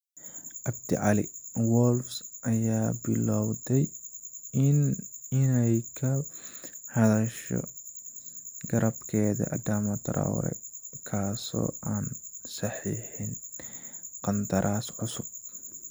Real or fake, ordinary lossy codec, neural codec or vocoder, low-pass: real; none; none; none